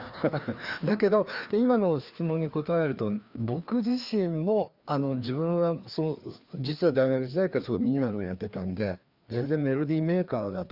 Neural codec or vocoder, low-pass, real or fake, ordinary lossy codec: codec, 16 kHz, 2 kbps, FreqCodec, larger model; 5.4 kHz; fake; Opus, 64 kbps